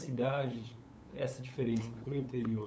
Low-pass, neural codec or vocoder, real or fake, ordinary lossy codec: none; codec, 16 kHz, 8 kbps, FunCodec, trained on LibriTTS, 25 frames a second; fake; none